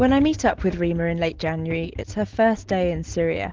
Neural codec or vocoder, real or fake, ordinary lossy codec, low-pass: none; real; Opus, 16 kbps; 7.2 kHz